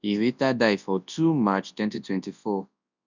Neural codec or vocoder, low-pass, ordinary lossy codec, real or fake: codec, 24 kHz, 0.9 kbps, WavTokenizer, large speech release; 7.2 kHz; none; fake